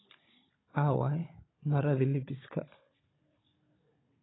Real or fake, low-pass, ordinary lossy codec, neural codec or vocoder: fake; 7.2 kHz; AAC, 16 kbps; codec, 16 kHz, 16 kbps, FunCodec, trained on Chinese and English, 50 frames a second